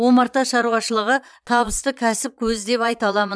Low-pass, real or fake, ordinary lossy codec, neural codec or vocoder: none; fake; none; vocoder, 22.05 kHz, 80 mel bands, Vocos